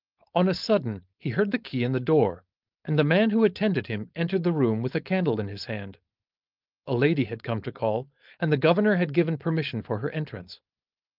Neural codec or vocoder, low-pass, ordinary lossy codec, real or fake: codec, 16 kHz, 4.8 kbps, FACodec; 5.4 kHz; Opus, 24 kbps; fake